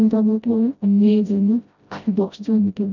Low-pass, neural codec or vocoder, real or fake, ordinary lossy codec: 7.2 kHz; codec, 16 kHz, 0.5 kbps, FreqCodec, smaller model; fake; none